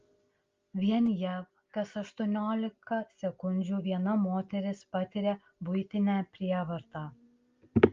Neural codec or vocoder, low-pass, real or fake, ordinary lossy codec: none; 7.2 kHz; real; Opus, 32 kbps